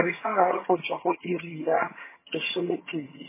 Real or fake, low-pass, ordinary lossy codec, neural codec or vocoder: fake; 3.6 kHz; MP3, 16 kbps; vocoder, 22.05 kHz, 80 mel bands, HiFi-GAN